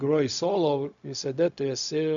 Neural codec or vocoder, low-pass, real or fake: codec, 16 kHz, 0.4 kbps, LongCat-Audio-Codec; 7.2 kHz; fake